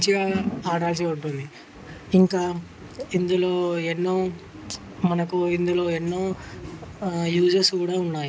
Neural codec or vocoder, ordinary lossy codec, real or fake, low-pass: none; none; real; none